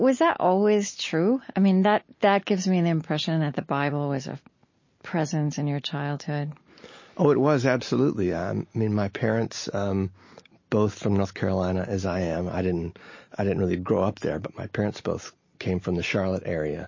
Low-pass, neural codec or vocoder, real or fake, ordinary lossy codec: 7.2 kHz; none; real; MP3, 32 kbps